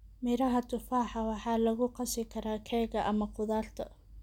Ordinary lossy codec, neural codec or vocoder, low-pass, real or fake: none; none; 19.8 kHz; real